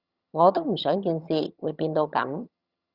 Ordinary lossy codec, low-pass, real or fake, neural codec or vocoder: Opus, 64 kbps; 5.4 kHz; fake; vocoder, 22.05 kHz, 80 mel bands, HiFi-GAN